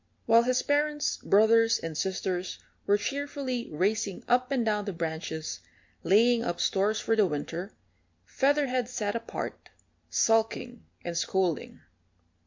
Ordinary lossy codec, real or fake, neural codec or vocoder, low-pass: MP3, 48 kbps; real; none; 7.2 kHz